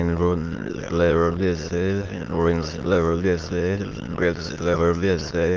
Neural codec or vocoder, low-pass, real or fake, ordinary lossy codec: autoencoder, 22.05 kHz, a latent of 192 numbers a frame, VITS, trained on many speakers; 7.2 kHz; fake; Opus, 16 kbps